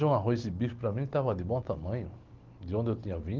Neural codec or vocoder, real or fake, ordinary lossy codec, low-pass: none; real; Opus, 16 kbps; 7.2 kHz